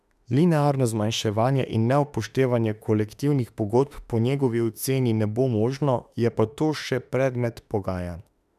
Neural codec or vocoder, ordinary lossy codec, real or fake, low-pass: autoencoder, 48 kHz, 32 numbers a frame, DAC-VAE, trained on Japanese speech; none; fake; 14.4 kHz